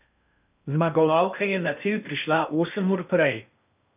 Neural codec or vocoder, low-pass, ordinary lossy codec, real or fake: codec, 16 kHz in and 24 kHz out, 0.6 kbps, FocalCodec, streaming, 2048 codes; 3.6 kHz; none; fake